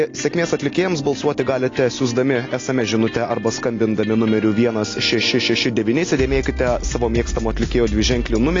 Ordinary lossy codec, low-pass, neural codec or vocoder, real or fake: AAC, 32 kbps; 7.2 kHz; none; real